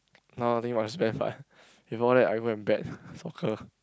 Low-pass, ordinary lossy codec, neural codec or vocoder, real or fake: none; none; none; real